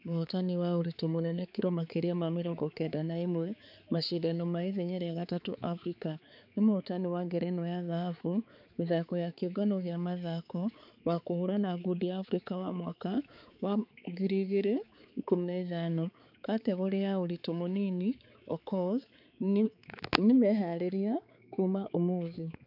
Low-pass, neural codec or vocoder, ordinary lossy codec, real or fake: 5.4 kHz; codec, 16 kHz, 4 kbps, X-Codec, HuBERT features, trained on balanced general audio; none; fake